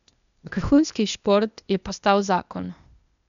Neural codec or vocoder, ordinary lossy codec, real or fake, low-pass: codec, 16 kHz, 0.8 kbps, ZipCodec; none; fake; 7.2 kHz